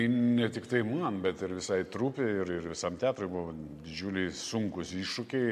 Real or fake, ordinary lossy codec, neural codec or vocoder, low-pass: real; AAC, 96 kbps; none; 14.4 kHz